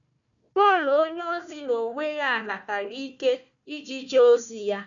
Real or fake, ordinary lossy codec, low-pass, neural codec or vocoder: fake; none; 7.2 kHz; codec, 16 kHz, 1 kbps, FunCodec, trained on Chinese and English, 50 frames a second